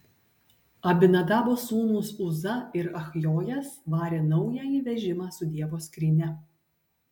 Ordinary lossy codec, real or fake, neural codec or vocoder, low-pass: MP3, 96 kbps; real; none; 19.8 kHz